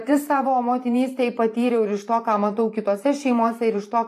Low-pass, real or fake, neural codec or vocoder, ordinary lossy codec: 14.4 kHz; fake; vocoder, 44.1 kHz, 128 mel bands every 512 samples, BigVGAN v2; AAC, 64 kbps